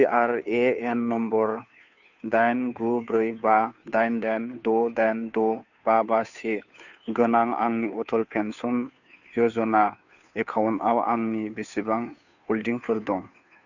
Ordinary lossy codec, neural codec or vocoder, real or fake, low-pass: none; codec, 16 kHz, 2 kbps, FunCodec, trained on Chinese and English, 25 frames a second; fake; 7.2 kHz